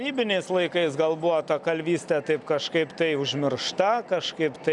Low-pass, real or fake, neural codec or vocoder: 10.8 kHz; real; none